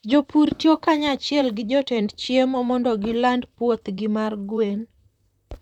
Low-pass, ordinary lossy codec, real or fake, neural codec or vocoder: 19.8 kHz; none; fake; vocoder, 44.1 kHz, 128 mel bands, Pupu-Vocoder